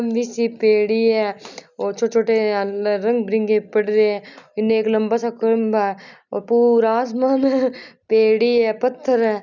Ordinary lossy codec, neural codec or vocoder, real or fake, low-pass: none; none; real; 7.2 kHz